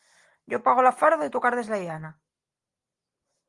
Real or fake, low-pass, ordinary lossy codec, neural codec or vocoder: real; 10.8 kHz; Opus, 24 kbps; none